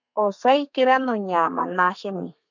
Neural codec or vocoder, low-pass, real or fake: codec, 32 kHz, 1.9 kbps, SNAC; 7.2 kHz; fake